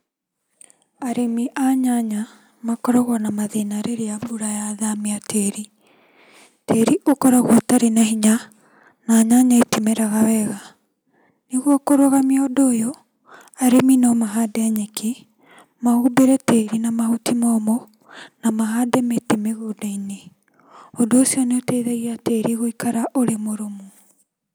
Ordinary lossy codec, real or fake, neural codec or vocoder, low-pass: none; real; none; none